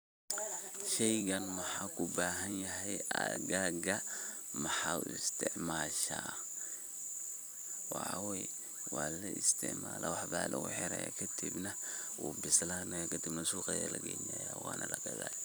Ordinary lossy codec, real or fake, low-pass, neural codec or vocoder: none; fake; none; vocoder, 44.1 kHz, 128 mel bands every 256 samples, BigVGAN v2